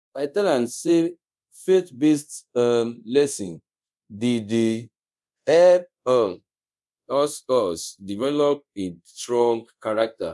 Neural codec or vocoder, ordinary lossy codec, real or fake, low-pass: codec, 24 kHz, 0.5 kbps, DualCodec; none; fake; none